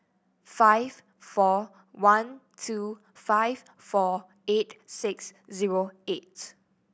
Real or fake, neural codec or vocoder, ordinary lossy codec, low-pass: real; none; none; none